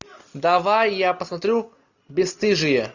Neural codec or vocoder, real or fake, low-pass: none; real; 7.2 kHz